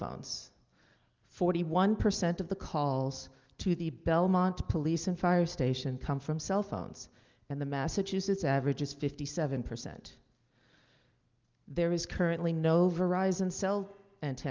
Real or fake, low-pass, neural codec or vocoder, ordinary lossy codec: real; 7.2 kHz; none; Opus, 32 kbps